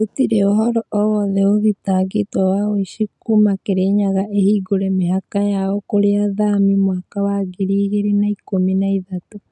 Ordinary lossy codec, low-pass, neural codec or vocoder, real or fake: none; 10.8 kHz; none; real